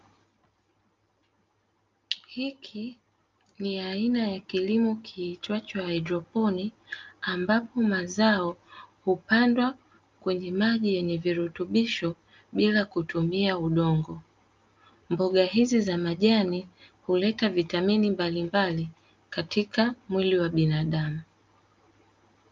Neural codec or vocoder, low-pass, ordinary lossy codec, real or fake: none; 7.2 kHz; Opus, 24 kbps; real